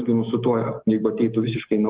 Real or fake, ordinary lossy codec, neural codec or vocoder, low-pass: real; Opus, 16 kbps; none; 3.6 kHz